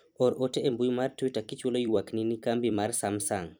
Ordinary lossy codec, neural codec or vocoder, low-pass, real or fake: none; vocoder, 44.1 kHz, 128 mel bands every 256 samples, BigVGAN v2; none; fake